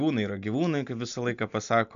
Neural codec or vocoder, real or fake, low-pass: none; real; 7.2 kHz